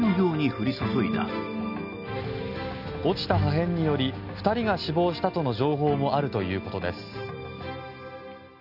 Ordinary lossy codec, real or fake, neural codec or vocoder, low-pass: none; real; none; 5.4 kHz